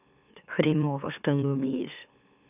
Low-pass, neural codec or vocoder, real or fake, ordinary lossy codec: 3.6 kHz; autoencoder, 44.1 kHz, a latent of 192 numbers a frame, MeloTTS; fake; none